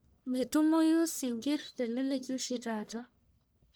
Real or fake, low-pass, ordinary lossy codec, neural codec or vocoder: fake; none; none; codec, 44.1 kHz, 1.7 kbps, Pupu-Codec